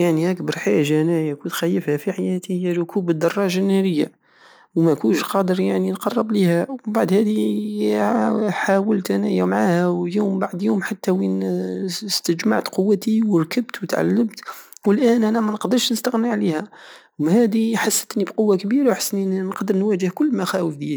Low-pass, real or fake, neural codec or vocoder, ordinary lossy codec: none; real; none; none